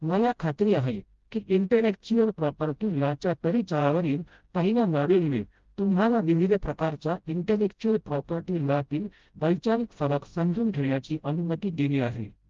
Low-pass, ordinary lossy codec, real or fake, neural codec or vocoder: 7.2 kHz; Opus, 32 kbps; fake; codec, 16 kHz, 0.5 kbps, FreqCodec, smaller model